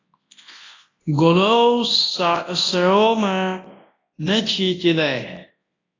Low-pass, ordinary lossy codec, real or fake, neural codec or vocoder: 7.2 kHz; AAC, 32 kbps; fake; codec, 24 kHz, 0.9 kbps, WavTokenizer, large speech release